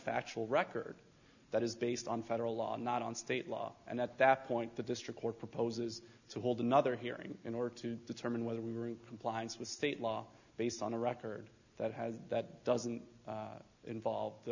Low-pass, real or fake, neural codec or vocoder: 7.2 kHz; real; none